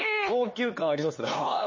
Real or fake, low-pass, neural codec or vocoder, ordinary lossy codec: fake; 7.2 kHz; codec, 16 kHz, 2 kbps, X-Codec, HuBERT features, trained on LibriSpeech; MP3, 32 kbps